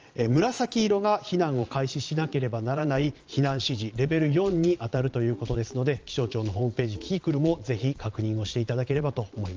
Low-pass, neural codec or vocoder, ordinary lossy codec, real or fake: 7.2 kHz; vocoder, 22.05 kHz, 80 mel bands, Vocos; Opus, 24 kbps; fake